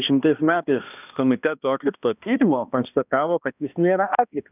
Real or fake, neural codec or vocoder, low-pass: fake; codec, 16 kHz, 1 kbps, X-Codec, HuBERT features, trained on balanced general audio; 3.6 kHz